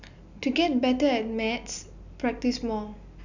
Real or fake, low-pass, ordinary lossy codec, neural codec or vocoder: real; 7.2 kHz; none; none